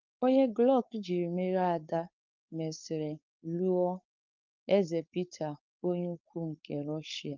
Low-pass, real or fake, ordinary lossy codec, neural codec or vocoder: 7.2 kHz; fake; Opus, 24 kbps; codec, 16 kHz, 4.8 kbps, FACodec